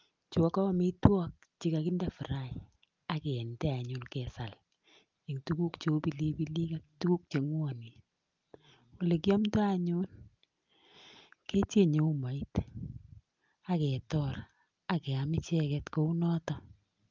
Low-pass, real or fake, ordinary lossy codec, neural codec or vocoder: 7.2 kHz; real; Opus, 32 kbps; none